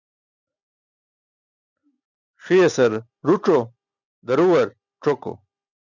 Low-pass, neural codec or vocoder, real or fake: 7.2 kHz; none; real